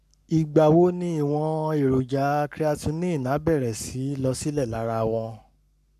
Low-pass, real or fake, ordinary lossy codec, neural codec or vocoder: 14.4 kHz; fake; none; codec, 44.1 kHz, 7.8 kbps, Pupu-Codec